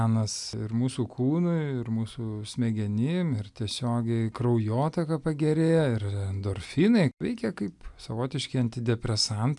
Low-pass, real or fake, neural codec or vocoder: 10.8 kHz; real; none